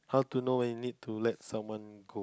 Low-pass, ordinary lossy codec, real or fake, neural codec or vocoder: none; none; real; none